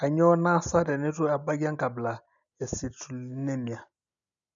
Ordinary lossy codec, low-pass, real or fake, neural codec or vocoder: none; 7.2 kHz; real; none